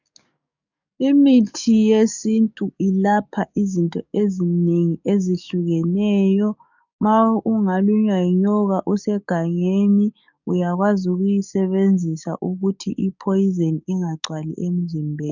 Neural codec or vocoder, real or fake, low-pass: codec, 44.1 kHz, 7.8 kbps, DAC; fake; 7.2 kHz